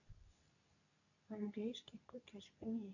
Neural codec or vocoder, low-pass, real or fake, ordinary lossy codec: codec, 24 kHz, 0.9 kbps, WavTokenizer, medium speech release version 2; 7.2 kHz; fake; none